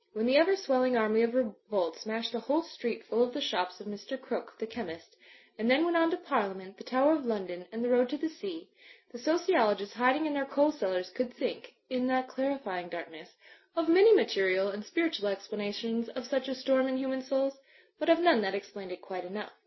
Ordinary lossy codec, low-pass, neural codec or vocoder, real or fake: MP3, 24 kbps; 7.2 kHz; none; real